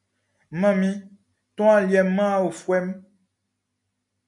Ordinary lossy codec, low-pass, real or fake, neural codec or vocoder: MP3, 96 kbps; 10.8 kHz; real; none